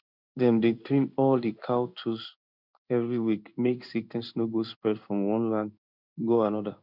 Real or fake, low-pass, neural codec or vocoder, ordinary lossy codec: fake; 5.4 kHz; codec, 16 kHz in and 24 kHz out, 1 kbps, XY-Tokenizer; none